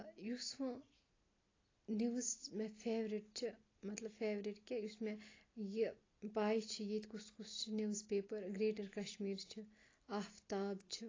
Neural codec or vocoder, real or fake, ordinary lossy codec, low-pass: none; real; AAC, 32 kbps; 7.2 kHz